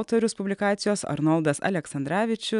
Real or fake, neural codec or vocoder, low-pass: real; none; 10.8 kHz